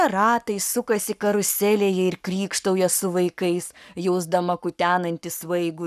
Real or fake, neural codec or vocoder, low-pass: fake; codec, 44.1 kHz, 7.8 kbps, Pupu-Codec; 14.4 kHz